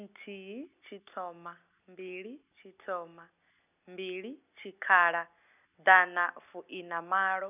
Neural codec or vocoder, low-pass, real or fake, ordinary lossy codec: none; 3.6 kHz; real; none